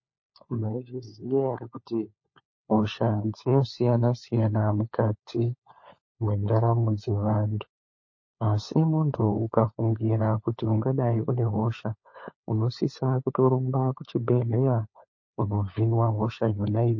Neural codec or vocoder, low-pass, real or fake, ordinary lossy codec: codec, 16 kHz, 4 kbps, FunCodec, trained on LibriTTS, 50 frames a second; 7.2 kHz; fake; MP3, 32 kbps